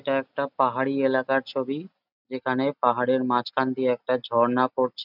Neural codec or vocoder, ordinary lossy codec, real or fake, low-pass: none; none; real; 5.4 kHz